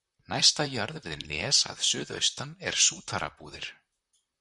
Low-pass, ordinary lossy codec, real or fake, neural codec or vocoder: 10.8 kHz; Opus, 64 kbps; fake; vocoder, 44.1 kHz, 128 mel bands, Pupu-Vocoder